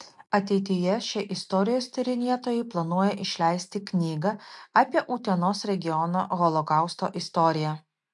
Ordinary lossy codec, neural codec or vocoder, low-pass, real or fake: MP3, 64 kbps; none; 10.8 kHz; real